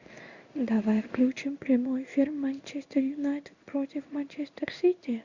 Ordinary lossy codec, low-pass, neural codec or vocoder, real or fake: Opus, 64 kbps; 7.2 kHz; codec, 16 kHz in and 24 kHz out, 1 kbps, XY-Tokenizer; fake